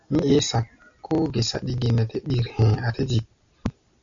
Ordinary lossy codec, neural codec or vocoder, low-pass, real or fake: MP3, 64 kbps; none; 7.2 kHz; real